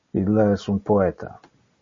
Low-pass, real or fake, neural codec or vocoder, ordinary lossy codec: 7.2 kHz; real; none; MP3, 32 kbps